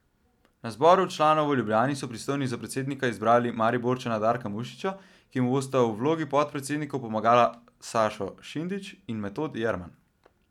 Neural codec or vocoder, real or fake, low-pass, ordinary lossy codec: none; real; 19.8 kHz; none